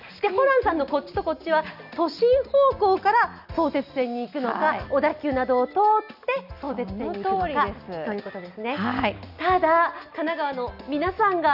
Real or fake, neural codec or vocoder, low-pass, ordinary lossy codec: real; none; 5.4 kHz; none